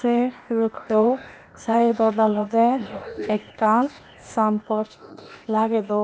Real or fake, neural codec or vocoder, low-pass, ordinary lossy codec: fake; codec, 16 kHz, 0.8 kbps, ZipCodec; none; none